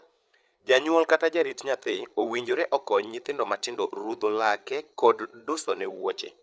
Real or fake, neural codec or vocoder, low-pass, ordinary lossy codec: fake; codec, 16 kHz, 8 kbps, FreqCodec, larger model; none; none